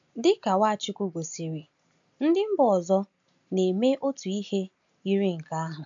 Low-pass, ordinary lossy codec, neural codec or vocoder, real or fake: 7.2 kHz; AAC, 64 kbps; none; real